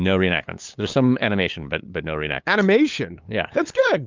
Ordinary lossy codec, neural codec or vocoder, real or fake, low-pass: Opus, 32 kbps; codec, 16 kHz, 8 kbps, FunCodec, trained on LibriTTS, 25 frames a second; fake; 7.2 kHz